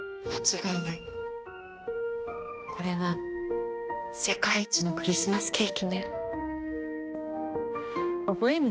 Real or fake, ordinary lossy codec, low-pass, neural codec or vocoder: fake; none; none; codec, 16 kHz, 1 kbps, X-Codec, HuBERT features, trained on balanced general audio